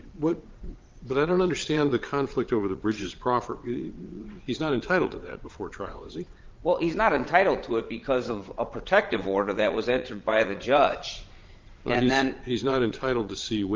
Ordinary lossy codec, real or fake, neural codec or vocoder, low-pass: Opus, 24 kbps; fake; vocoder, 22.05 kHz, 80 mel bands, WaveNeXt; 7.2 kHz